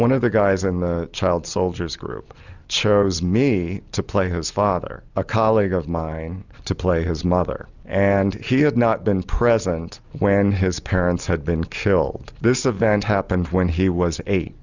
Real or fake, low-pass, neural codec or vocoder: real; 7.2 kHz; none